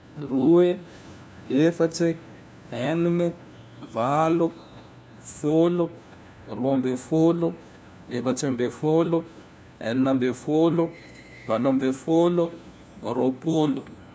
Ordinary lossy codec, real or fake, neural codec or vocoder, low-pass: none; fake; codec, 16 kHz, 1 kbps, FunCodec, trained on LibriTTS, 50 frames a second; none